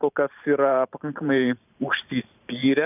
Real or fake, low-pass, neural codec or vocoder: fake; 3.6 kHz; vocoder, 44.1 kHz, 128 mel bands every 512 samples, BigVGAN v2